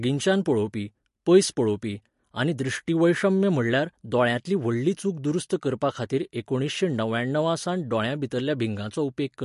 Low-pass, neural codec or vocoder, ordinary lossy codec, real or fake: 14.4 kHz; none; MP3, 48 kbps; real